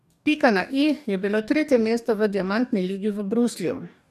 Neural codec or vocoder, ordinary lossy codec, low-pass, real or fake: codec, 44.1 kHz, 2.6 kbps, DAC; none; 14.4 kHz; fake